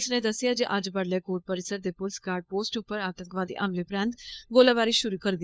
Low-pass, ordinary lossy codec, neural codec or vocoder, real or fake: none; none; codec, 16 kHz, 4 kbps, FunCodec, trained on LibriTTS, 50 frames a second; fake